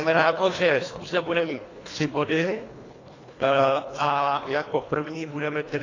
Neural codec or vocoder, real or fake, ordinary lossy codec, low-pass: codec, 24 kHz, 1.5 kbps, HILCodec; fake; AAC, 32 kbps; 7.2 kHz